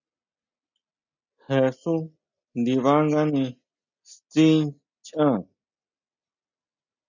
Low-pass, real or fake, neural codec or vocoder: 7.2 kHz; real; none